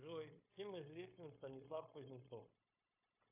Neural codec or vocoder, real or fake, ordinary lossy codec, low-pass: codec, 16 kHz, 0.9 kbps, LongCat-Audio-Codec; fake; AAC, 32 kbps; 3.6 kHz